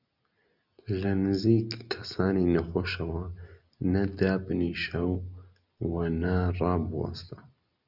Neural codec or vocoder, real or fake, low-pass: none; real; 5.4 kHz